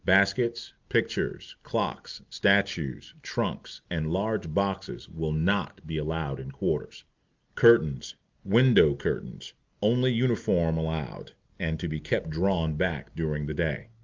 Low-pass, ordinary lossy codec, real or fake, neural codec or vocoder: 7.2 kHz; Opus, 24 kbps; real; none